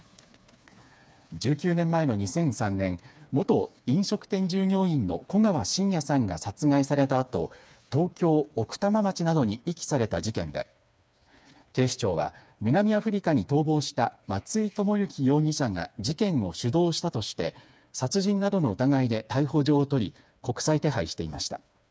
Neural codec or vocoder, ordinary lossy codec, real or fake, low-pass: codec, 16 kHz, 4 kbps, FreqCodec, smaller model; none; fake; none